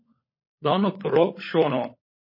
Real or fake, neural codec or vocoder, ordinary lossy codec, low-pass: fake; codec, 16 kHz, 16 kbps, FunCodec, trained on LibriTTS, 50 frames a second; MP3, 24 kbps; 5.4 kHz